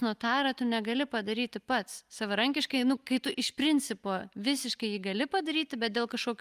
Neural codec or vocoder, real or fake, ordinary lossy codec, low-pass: none; real; Opus, 32 kbps; 14.4 kHz